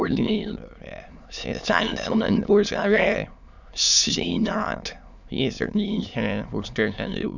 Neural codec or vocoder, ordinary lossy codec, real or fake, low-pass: autoencoder, 22.05 kHz, a latent of 192 numbers a frame, VITS, trained on many speakers; none; fake; 7.2 kHz